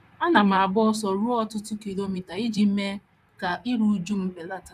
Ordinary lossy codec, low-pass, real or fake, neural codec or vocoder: none; 14.4 kHz; fake; vocoder, 44.1 kHz, 128 mel bands, Pupu-Vocoder